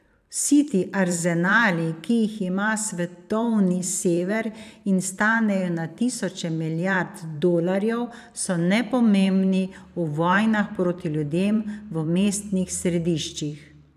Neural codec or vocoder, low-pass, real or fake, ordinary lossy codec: vocoder, 44.1 kHz, 128 mel bands every 512 samples, BigVGAN v2; 14.4 kHz; fake; none